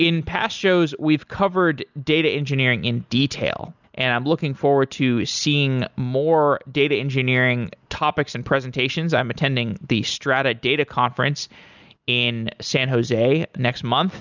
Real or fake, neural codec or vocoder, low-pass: real; none; 7.2 kHz